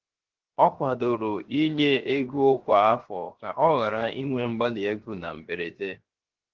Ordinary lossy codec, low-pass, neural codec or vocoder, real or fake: Opus, 16 kbps; 7.2 kHz; codec, 16 kHz, 0.7 kbps, FocalCodec; fake